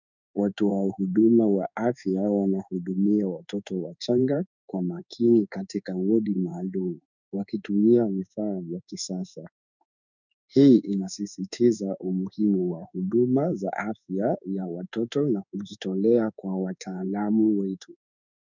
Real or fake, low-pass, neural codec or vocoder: fake; 7.2 kHz; codec, 16 kHz in and 24 kHz out, 1 kbps, XY-Tokenizer